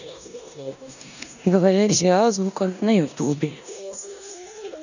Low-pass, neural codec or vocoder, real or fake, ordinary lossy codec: 7.2 kHz; codec, 16 kHz in and 24 kHz out, 0.9 kbps, LongCat-Audio-Codec, four codebook decoder; fake; none